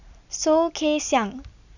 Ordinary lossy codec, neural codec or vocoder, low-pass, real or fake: none; none; 7.2 kHz; real